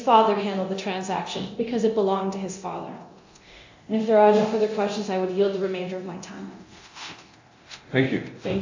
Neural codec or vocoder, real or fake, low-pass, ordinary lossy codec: codec, 24 kHz, 0.9 kbps, DualCodec; fake; 7.2 kHz; AAC, 48 kbps